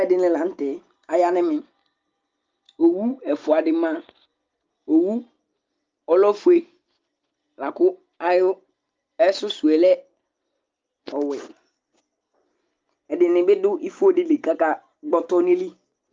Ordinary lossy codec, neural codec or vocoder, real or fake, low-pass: Opus, 24 kbps; none; real; 7.2 kHz